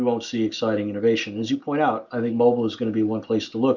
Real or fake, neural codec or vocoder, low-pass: real; none; 7.2 kHz